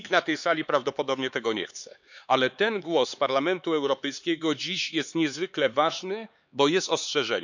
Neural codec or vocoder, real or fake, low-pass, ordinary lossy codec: codec, 16 kHz, 4 kbps, X-Codec, HuBERT features, trained on LibriSpeech; fake; 7.2 kHz; none